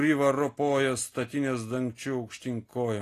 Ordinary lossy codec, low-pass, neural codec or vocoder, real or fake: AAC, 48 kbps; 14.4 kHz; none; real